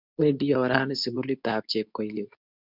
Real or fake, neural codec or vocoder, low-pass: fake; codec, 24 kHz, 0.9 kbps, WavTokenizer, medium speech release version 2; 5.4 kHz